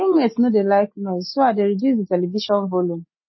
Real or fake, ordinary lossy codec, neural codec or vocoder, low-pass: real; MP3, 24 kbps; none; 7.2 kHz